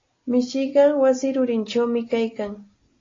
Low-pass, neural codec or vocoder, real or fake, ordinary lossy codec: 7.2 kHz; none; real; AAC, 32 kbps